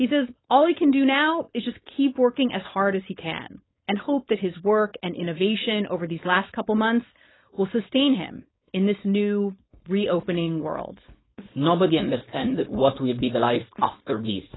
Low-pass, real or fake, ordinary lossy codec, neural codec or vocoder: 7.2 kHz; fake; AAC, 16 kbps; codec, 16 kHz, 4.8 kbps, FACodec